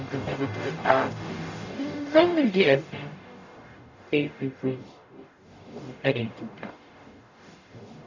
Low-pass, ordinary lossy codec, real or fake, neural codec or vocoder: 7.2 kHz; none; fake; codec, 44.1 kHz, 0.9 kbps, DAC